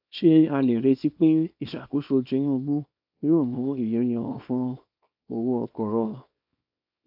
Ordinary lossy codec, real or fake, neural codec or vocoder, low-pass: AAC, 48 kbps; fake; codec, 24 kHz, 0.9 kbps, WavTokenizer, small release; 5.4 kHz